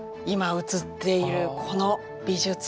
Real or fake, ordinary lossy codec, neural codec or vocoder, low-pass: real; none; none; none